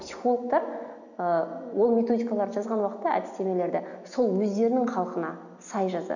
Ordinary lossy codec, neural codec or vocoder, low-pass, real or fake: none; none; 7.2 kHz; real